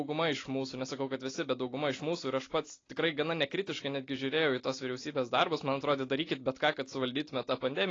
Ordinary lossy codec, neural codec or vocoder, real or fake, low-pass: AAC, 32 kbps; none; real; 7.2 kHz